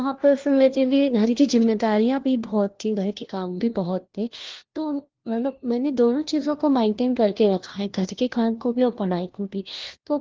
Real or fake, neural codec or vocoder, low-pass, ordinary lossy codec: fake; codec, 16 kHz, 1 kbps, FunCodec, trained on LibriTTS, 50 frames a second; 7.2 kHz; Opus, 16 kbps